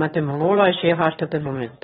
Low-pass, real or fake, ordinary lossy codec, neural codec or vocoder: 9.9 kHz; fake; AAC, 16 kbps; autoencoder, 22.05 kHz, a latent of 192 numbers a frame, VITS, trained on one speaker